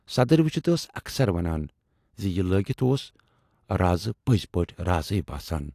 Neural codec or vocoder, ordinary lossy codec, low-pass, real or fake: none; AAC, 64 kbps; 14.4 kHz; real